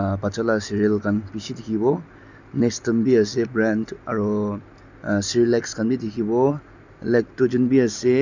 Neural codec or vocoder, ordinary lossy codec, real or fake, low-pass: none; none; real; 7.2 kHz